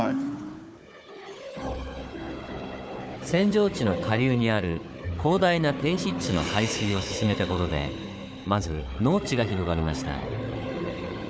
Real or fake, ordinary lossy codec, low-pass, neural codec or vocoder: fake; none; none; codec, 16 kHz, 4 kbps, FunCodec, trained on Chinese and English, 50 frames a second